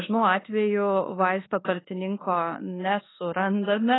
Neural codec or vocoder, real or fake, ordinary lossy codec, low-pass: codec, 24 kHz, 1.2 kbps, DualCodec; fake; AAC, 16 kbps; 7.2 kHz